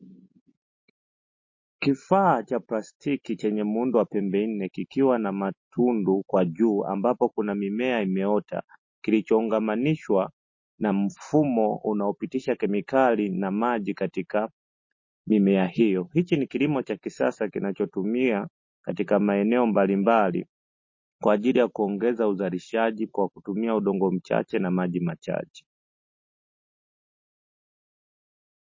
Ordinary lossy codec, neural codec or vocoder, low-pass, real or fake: MP3, 32 kbps; none; 7.2 kHz; real